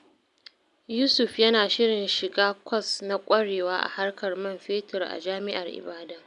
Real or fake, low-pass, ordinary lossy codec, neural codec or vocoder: real; 10.8 kHz; none; none